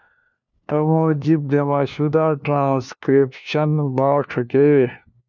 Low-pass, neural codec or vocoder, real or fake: 7.2 kHz; codec, 16 kHz, 1 kbps, FunCodec, trained on LibriTTS, 50 frames a second; fake